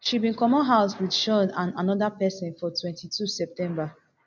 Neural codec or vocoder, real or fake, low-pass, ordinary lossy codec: none; real; 7.2 kHz; none